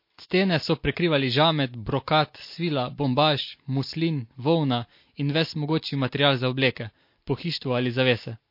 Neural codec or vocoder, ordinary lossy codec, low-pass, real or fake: none; MP3, 32 kbps; 5.4 kHz; real